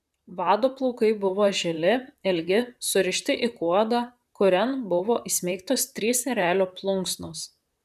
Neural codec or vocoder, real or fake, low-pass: vocoder, 44.1 kHz, 128 mel bands, Pupu-Vocoder; fake; 14.4 kHz